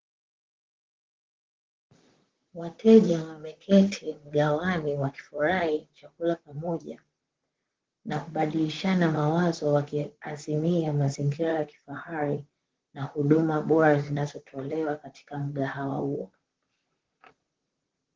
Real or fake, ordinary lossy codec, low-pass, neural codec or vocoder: fake; Opus, 16 kbps; 7.2 kHz; vocoder, 44.1 kHz, 128 mel bands, Pupu-Vocoder